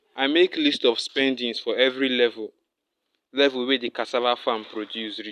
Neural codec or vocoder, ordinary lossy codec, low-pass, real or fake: none; none; 14.4 kHz; real